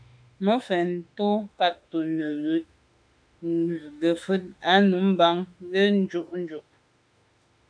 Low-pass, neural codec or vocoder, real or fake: 9.9 kHz; autoencoder, 48 kHz, 32 numbers a frame, DAC-VAE, trained on Japanese speech; fake